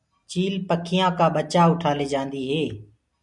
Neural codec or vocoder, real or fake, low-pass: none; real; 10.8 kHz